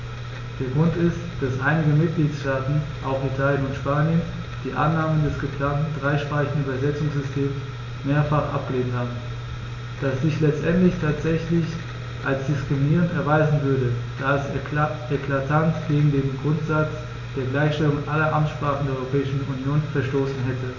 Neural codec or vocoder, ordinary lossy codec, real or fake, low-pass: none; none; real; 7.2 kHz